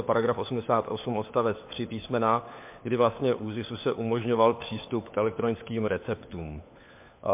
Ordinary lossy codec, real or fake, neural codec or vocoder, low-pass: MP3, 32 kbps; fake; codec, 16 kHz, 4 kbps, FunCodec, trained on LibriTTS, 50 frames a second; 3.6 kHz